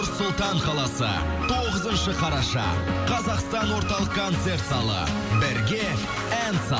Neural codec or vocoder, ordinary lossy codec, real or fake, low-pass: none; none; real; none